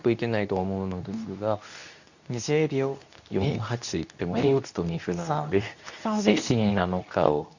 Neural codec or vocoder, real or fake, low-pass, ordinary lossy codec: codec, 24 kHz, 0.9 kbps, WavTokenizer, medium speech release version 2; fake; 7.2 kHz; none